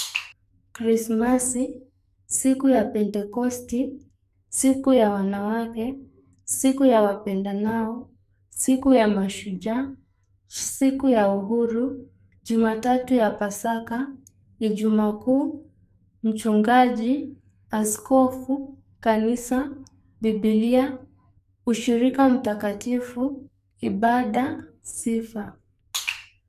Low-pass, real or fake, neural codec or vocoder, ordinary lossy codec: 14.4 kHz; fake; codec, 44.1 kHz, 2.6 kbps, SNAC; none